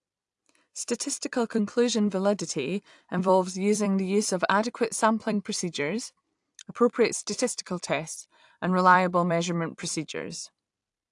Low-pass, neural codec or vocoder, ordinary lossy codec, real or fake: 10.8 kHz; vocoder, 44.1 kHz, 128 mel bands every 256 samples, BigVGAN v2; AAC, 64 kbps; fake